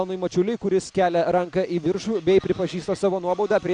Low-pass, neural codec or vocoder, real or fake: 9.9 kHz; none; real